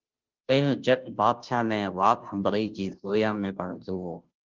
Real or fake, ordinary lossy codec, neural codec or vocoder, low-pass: fake; Opus, 24 kbps; codec, 16 kHz, 0.5 kbps, FunCodec, trained on Chinese and English, 25 frames a second; 7.2 kHz